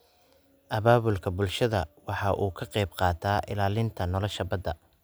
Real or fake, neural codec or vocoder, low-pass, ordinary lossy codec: real; none; none; none